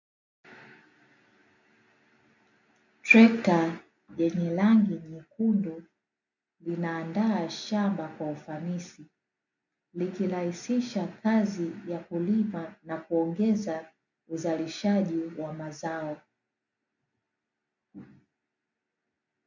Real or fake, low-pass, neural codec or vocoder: real; 7.2 kHz; none